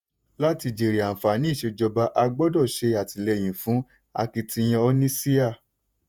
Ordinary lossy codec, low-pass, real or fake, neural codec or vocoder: none; none; fake; vocoder, 48 kHz, 128 mel bands, Vocos